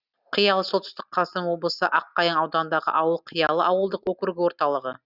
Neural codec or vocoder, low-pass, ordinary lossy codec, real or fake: none; 5.4 kHz; Opus, 64 kbps; real